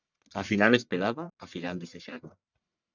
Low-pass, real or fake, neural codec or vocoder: 7.2 kHz; fake; codec, 44.1 kHz, 1.7 kbps, Pupu-Codec